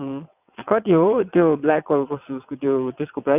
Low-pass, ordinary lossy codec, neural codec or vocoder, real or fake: 3.6 kHz; none; vocoder, 22.05 kHz, 80 mel bands, WaveNeXt; fake